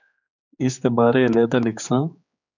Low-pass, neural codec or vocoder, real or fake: 7.2 kHz; codec, 16 kHz, 4 kbps, X-Codec, HuBERT features, trained on general audio; fake